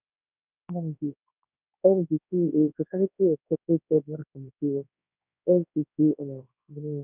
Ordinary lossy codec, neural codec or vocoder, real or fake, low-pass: none; codec, 24 kHz, 0.9 kbps, WavTokenizer, large speech release; fake; 3.6 kHz